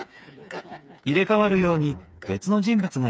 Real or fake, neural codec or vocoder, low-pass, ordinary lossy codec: fake; codec, 16 kHz, 4 kbps, FreqCodec, smaller model; none; none